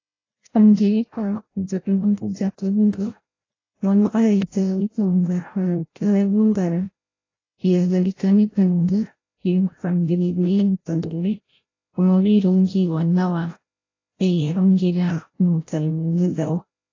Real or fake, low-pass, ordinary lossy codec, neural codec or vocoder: fake; 7.2 kHz; AAC, 32 kbps; codec, 16 kHz, 0.5 kbps, FreqCodec, larger model